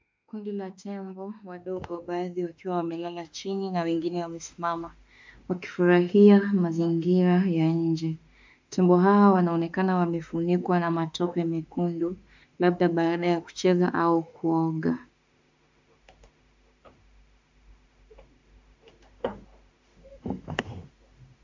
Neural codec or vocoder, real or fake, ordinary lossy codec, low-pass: autoencoder, 48 kHz, 32 numbers a frame, DAC-VAE, trained on Japanese speech; fake; MP3, 64 kbps; 7.2 kHz